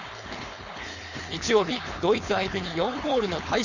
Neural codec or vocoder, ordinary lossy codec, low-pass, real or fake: codec, 16 kHz, 4.8 kbps, FACodec; none; 7.2 kHz; fake